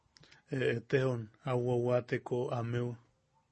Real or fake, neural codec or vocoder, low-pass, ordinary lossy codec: real; none; 9.9 kHz; MP3, 32 kbps